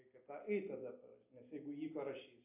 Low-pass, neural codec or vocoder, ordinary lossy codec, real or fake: 3.6 kHz; none; AAC, 32 kbps; real